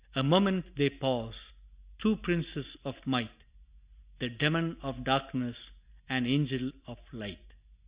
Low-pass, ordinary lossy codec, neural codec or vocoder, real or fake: 3.6 kHz; Opus, 64 kbps; none; real